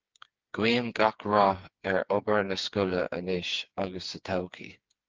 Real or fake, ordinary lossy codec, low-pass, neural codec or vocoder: fake; Opus, 32 kbps; 7.2 kHz; codec, 16 kHz, 4 kbps, FreqCodec, smaller model